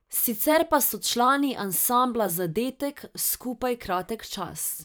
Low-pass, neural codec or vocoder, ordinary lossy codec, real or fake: none; vocoder, 44.1 kHz, 128 mel bands every 512 samples, BigVGAN v2; none; fake